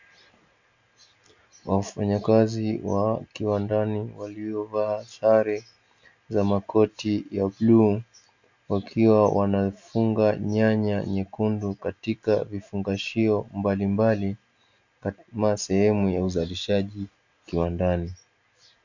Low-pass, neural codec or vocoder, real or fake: 7.2 kHz; none; real